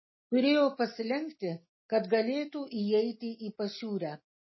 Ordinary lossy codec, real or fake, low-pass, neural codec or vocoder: MP3, 24 kbps; real; 7.2 kHz; none